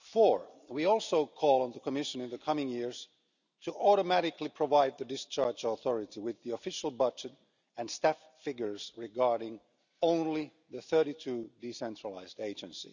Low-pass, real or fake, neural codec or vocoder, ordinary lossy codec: 7.2 kHz; real; none; none